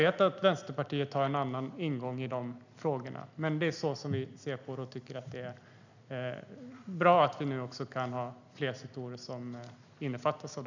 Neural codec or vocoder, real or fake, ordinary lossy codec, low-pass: none; real; none; 7.2 kHz